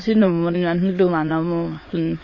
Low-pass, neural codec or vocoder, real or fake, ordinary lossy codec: 7.2 kHz; autoencoder, 22.05 kHz, a latent of 192 numbers a frame, VITS, trained on many speakers; fake; MP3, 32 kbps